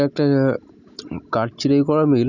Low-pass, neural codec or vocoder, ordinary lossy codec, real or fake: 7.2 kHz; none; none; real